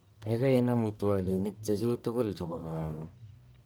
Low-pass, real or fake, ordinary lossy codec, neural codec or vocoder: none; fake; none; codec, 44.1 kHz, 1.7 kbps, Pupu-Codec